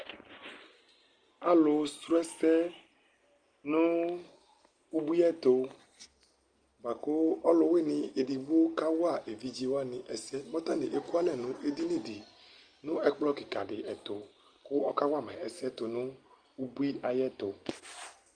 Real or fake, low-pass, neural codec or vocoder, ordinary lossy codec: real; 9.9 kHz; none; Opus, 32 kbps